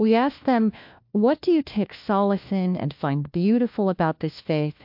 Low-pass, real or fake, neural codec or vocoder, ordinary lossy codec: 5.4 kHz; fake; codec, 16 kHz, 1 kbps, FunCodec, trained on LibriTTS, 50 frames a second; MP3, 48 kbps